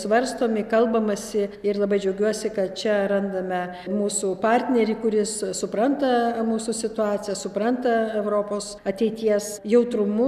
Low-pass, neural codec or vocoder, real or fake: 14.4 kHz; none; real